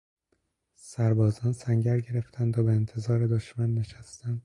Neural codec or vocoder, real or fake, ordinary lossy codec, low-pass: none; real; AAC, 48 kbps; 10.8 kHz